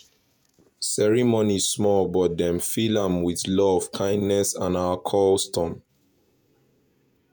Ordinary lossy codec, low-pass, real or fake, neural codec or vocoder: none; none; fake; vocoder, 48 kHz, 128 mel bands, Vocos